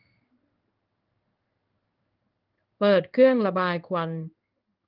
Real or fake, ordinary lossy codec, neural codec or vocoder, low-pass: fake; Opus, 24 kbps; codec, 16 kHz in and 24 kHz out, 1 kbps, XY-Tokenizer; 5.4 kHz